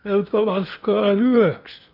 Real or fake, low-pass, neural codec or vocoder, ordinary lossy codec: fake; 5.4 kHz; codec, 16 kHz in and 24 kHz out, 0.8 kbps, FocalCodec, streaming, 65536 codes; none